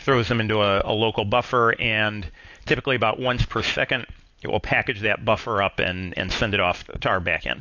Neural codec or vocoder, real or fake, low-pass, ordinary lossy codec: none; real; 7.2 kHz; AAC, 48 kbps